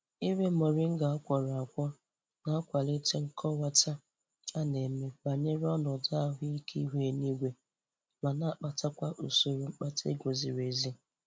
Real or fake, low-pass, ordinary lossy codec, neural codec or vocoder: real; none; none; none